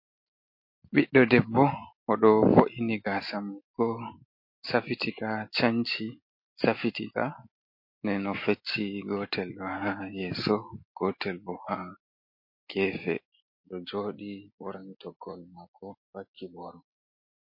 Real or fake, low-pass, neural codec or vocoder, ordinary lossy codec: real; 5.4 kHz; none; MP3, 32 kbps